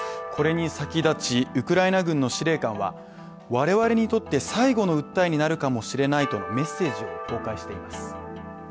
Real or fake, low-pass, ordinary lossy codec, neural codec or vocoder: real; none; none; none